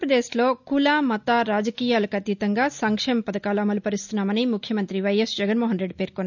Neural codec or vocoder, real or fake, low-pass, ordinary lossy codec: none; real; 7.2 kHz; none